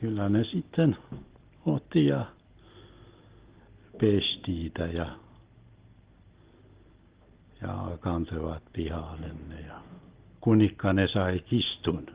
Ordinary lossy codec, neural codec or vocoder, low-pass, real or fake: Opus, 16 kbps; none; 3.6 kHz; real